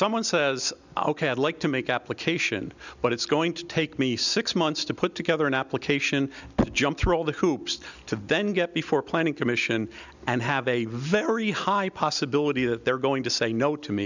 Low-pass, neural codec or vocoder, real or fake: 7.2 kHz; none; real